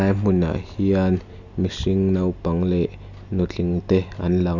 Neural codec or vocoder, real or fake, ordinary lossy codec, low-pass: none; real; none; 7.2 kHz